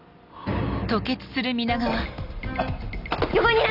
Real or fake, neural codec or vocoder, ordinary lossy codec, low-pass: real; none; none; 5.4 kHz